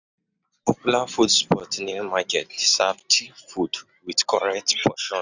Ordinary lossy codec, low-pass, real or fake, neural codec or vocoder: none; 7.2 kHz; real; none